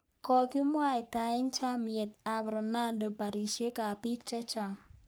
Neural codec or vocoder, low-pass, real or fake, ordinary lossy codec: codec, 44.1 kHz, 3.4 kbps, Pupu-Codec; none; fake; none